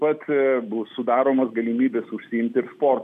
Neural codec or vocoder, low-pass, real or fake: none; 14.4 kHz; real